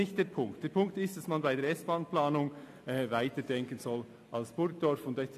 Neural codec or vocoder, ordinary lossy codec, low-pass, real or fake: none; AAC, 64 kbps; 14.4 kHz; real